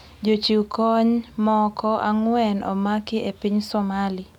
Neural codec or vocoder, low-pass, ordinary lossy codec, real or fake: none; 19.8 kHz; none; real